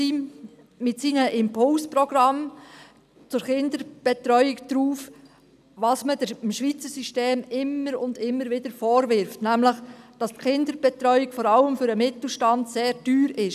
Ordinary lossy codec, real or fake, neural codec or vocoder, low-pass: none; real; none; 14.4 kHz